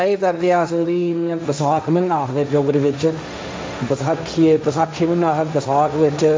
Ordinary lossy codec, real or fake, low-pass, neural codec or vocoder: none; fake; none; codec, 16 kHz, 1.1 kbps, Voila-Tokenizer